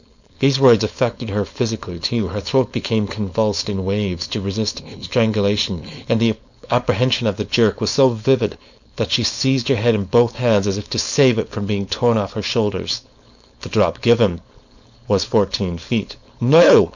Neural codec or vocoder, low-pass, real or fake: codec, 16 kHz, 4.8 kbps, FACodec; 7.2 kHz; fake